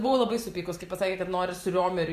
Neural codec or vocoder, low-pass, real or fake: vocoder, 44.1 kHz, 128 mel bands every 512 samples, BigVGAN v2; 14.4 kHz; fake